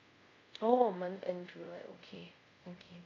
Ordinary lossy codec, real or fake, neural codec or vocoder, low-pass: AAC, 48 kbps; fake; codec, 24 kHz, 0.5 kbps, DualCodec; 7.2 kHz